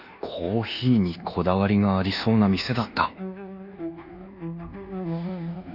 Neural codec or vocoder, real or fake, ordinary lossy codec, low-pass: codec, 24 kHz, 1.2 kbps, DualCodec; fake; none; 5.4 kHz